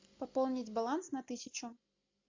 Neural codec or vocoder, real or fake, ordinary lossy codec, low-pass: none; real; AAC, 48 kbps; 7.2 kHz